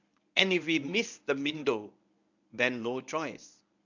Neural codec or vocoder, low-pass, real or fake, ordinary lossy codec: codec, 24 kHz, 0.9 kbps, WavTokenizer, medium speech release version 1; 7.2 kHz; fake; none